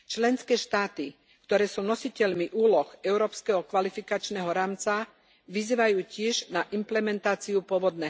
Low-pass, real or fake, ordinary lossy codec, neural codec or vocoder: none; real; none; none